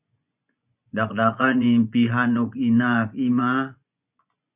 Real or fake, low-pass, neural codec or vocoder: fake; 3.6 kHz; vocoder, 24 kHz, 100 mel bands, Vocos